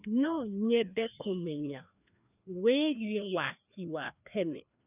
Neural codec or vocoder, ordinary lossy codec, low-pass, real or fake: codec, 16 kHz, 2 kbps, FreqCodec, larger model; none; 3.6 kHz; fake